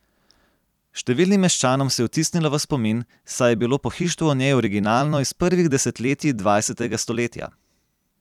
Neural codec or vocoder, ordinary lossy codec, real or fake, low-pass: vocoder, 44.1 kHz, 128 mel bands every 256 samples, BigVGAN v2; none; fake; 19.8 kHz